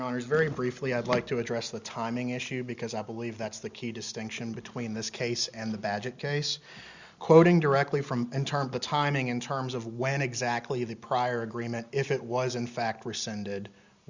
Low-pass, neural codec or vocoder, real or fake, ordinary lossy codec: 7.2 kHz; none; real; Opus, 64 kbps